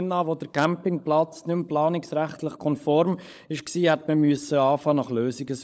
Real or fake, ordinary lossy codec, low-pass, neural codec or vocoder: fake; none; none; codec, 16 kHz, 16 kbps, FunCodec, trained on LibriTTS, 50 frames a second